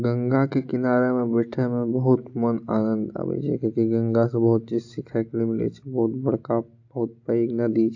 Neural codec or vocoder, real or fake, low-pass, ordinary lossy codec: none; real; 7.2 kHz; AAC, 48 kbps